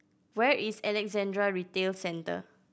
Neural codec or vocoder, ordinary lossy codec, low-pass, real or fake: none; none; none; real